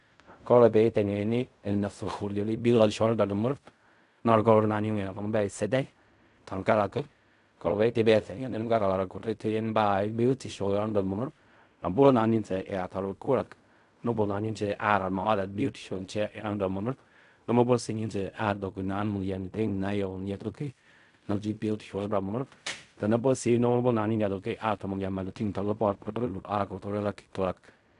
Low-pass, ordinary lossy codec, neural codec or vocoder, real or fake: 10.8 kHz; none; codec, 16 kHz in and 24 kHz out, 0.4 kbps, LongCat-Audio-Codec, fine tuned four codebook decoder; fake